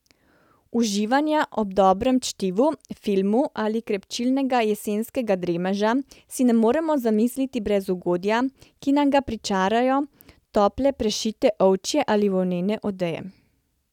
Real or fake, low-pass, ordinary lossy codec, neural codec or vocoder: real; 19.8 kHz; none; none